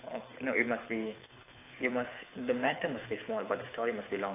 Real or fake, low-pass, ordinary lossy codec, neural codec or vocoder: fake; 3.6 kHz; AAC, 24 kbps; codec, 24 kHz, 6 kbps, HILCodec